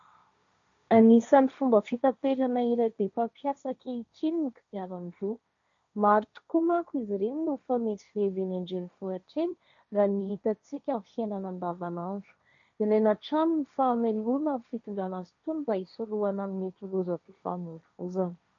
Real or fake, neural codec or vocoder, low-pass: fake; codec, 16 kHz, 1.1 kbps, Voila-Tokenizer; 7.2 kHz